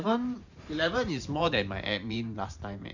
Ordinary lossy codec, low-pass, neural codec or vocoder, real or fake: Opus, 64 kbps; 7.2 kHz; vocoder, 44.1 kHz, 128 mel bands every 512 samples, BigVGAN v2; fake